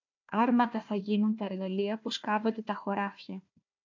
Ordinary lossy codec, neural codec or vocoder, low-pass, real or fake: MP3, 64 kbps; codec, 16 kHz, 1 kbps, FunCodec, trained on Chinese and English, 50 frames a second; 7.2 kHz; fake